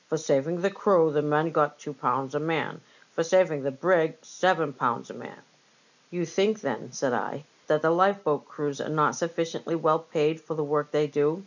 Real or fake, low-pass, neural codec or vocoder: real; 7.2 kHz; none